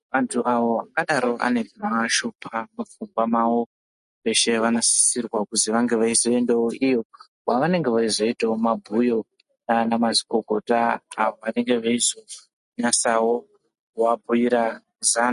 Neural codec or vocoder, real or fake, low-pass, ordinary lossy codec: none; real; 14.4 kHz; MP3, 48 kbps